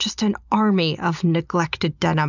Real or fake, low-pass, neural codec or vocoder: real; 7.2 kHz; none